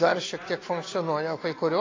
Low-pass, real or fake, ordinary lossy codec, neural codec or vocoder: 7.2 kHz; real; AAC, 32 kbps; none